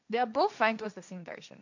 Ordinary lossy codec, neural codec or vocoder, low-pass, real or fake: none; codec, 16 kHz, 1.1 kbps, Voila-Tokenizer; 7.2 kHz; fake